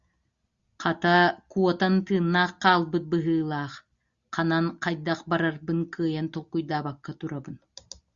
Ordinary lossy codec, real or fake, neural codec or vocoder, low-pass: Opus, 64 kbps; real; none; 7.2 kHz